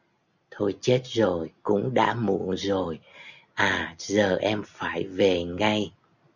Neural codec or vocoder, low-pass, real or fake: none; 7.2 kHz; real